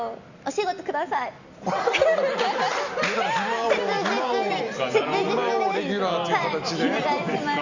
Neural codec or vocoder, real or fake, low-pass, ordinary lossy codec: none; real; 7.2 kHz; Opus, 64 kbps